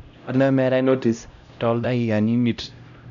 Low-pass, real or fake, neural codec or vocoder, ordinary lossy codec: 7.2 kHz; fake; codec, 16 kHz, 0.5 kbps, X-Codec, HuBERT features, trained on LibriSpeech; none